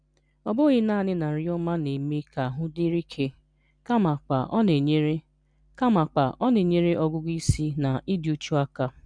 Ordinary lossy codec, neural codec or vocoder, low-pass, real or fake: none; none; 9.9 kHz; real